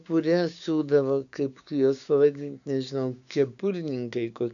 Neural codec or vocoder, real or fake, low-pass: codec, 16 kHz, 6 kbps, DAC; fake; 7.2 kHz